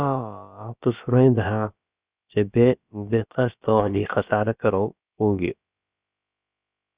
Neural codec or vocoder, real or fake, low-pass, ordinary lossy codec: codec, 16 kHz, about 1 kbps, DyCAST, with the encoder's durations; fake; 3.6 kHz; Opus, 64 kbps